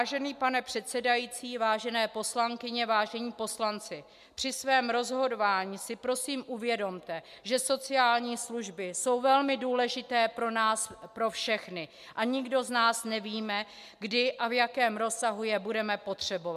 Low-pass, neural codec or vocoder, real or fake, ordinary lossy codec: 14.4 kHz; none; real; MP3, 96 kbps